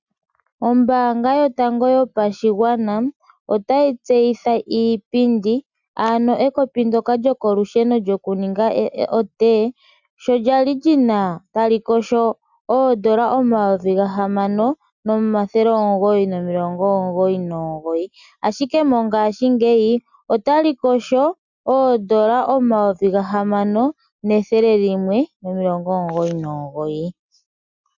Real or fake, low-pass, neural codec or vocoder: real; 7.2 kHz; none